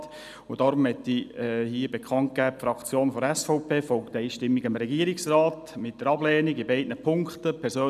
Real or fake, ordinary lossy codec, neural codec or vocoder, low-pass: real; none; none; 14.4 kHz